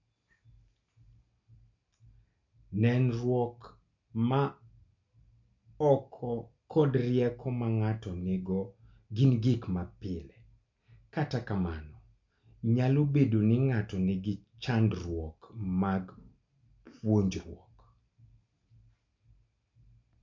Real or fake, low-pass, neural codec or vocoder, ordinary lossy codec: real; 7.2 kHz; none; MP3, 64 kbps